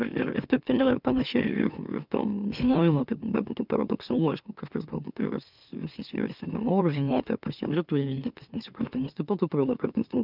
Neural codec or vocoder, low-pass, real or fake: autoencoder, 44.1 kHz, a latent of 192 numbers a frame, MeloTTS; 5.4 kHz; fake